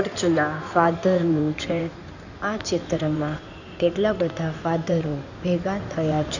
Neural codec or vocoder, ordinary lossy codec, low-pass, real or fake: codec, 16 kHz in and 24 kHz out, 2.2 kbps, FireRedTTS-2 codec; none; 7.2 kHz; fake